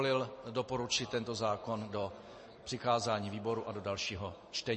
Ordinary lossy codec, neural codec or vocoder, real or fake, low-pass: MP3, 32 kbps; none; real; 9.9 kHz